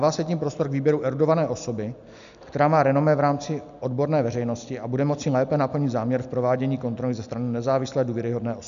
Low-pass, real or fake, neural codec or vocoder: 7.2 kHz; real; none